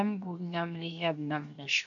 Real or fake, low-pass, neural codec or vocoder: fake; 7.2 kHz; codec, 16 kHz, 0.7 kbps, FocalCodec